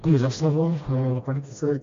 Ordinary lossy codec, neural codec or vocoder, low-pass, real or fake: MP3, 48 kbps; codec, 16 kHz, 1 kbps, FreqCodec, smaller model; 7.2 kHz; fake